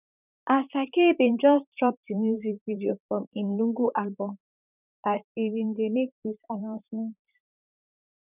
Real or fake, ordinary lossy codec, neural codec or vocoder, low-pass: fake; none; vocoder, 44.1 kHz, 128 mel bands, Pupu-Vocoder; 3.6 kHz